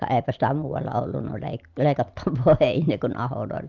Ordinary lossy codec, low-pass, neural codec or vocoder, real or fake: Opus, 32 kbps; 7.2 kHz; none; real